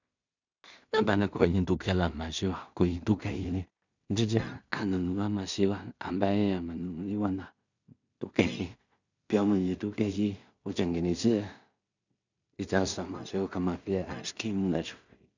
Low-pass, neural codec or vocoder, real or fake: 7.2 kHz; codec, 16 kHz in and 24 kHz out, 0.4 kbps, LongCat-Audio-Codec, two codebook decoder; fake